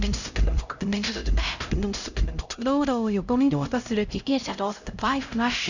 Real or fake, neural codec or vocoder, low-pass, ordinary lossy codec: fake; codec, 16 kHz, 0.5 kbps, X-Codec, HuBERT features, trained on LibriSpeech; 7.2 kHz; none